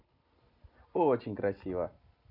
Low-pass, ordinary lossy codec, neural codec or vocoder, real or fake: 5.4 kHz; none; none; real